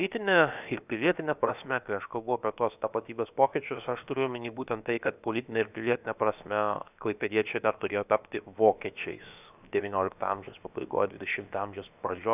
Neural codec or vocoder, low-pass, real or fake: codec, 16 kHz, about 1 kbps, DyCAST, with the encoder's durations; 3.6 kHz; fake